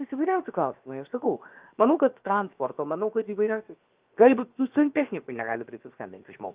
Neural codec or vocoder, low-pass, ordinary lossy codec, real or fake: codec, 16 kHz, 0.7 kbps, FocalCodec; 3.6 kHz; Opus, 32 kbps; fake